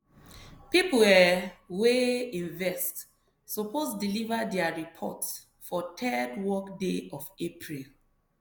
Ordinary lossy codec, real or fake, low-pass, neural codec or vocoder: none; real; none; none